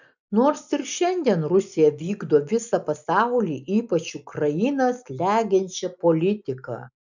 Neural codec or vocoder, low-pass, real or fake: none; 7.2 kHz; real